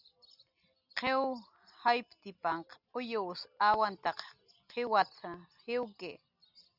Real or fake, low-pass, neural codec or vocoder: real; 5.4 kHz; none